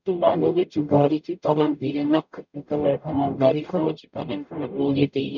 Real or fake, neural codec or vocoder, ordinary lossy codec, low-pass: fake; codec, 44.1 kHz, 0.9 kbps, DAC; none; 7.2 kHz